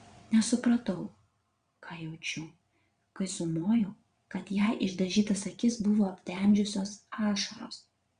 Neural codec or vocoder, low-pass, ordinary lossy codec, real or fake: vocoder, 22.05 kHz, 80 mel bands, WaveNeXt; 9.9 kHz; Opus, 64 kbps; fake